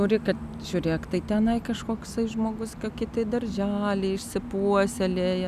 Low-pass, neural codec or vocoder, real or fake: 14.4 kHz; none; real